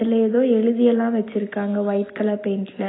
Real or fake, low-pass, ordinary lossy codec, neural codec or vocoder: real; 7.2 kHz; AAC, 16 kbps; none